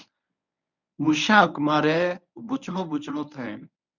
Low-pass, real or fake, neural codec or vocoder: 7.2 kHz; fake; codec, 24 kHz, 0.9 kbps, WavTokenizer, medium speech release version 1